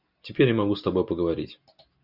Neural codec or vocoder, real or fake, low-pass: none; real; 5.4 kHz